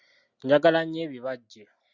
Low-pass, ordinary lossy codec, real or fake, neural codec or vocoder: 7.2 kHz; Opus, 64 kbps; real; none